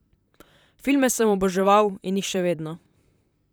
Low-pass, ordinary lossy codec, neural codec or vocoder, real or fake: none; none; vocoder, 44.1 kHz, 128 mel bands, Pupu-Vocoder; fake